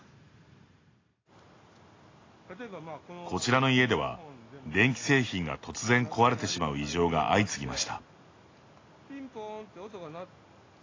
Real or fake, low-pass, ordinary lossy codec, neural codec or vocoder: real; 7.2 kHz; AAC, 32 kbps; none